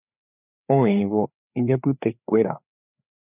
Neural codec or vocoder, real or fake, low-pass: codec, 16 kHz, 4 kbps, FreqCodec, larger model; fake; 3.6 kHz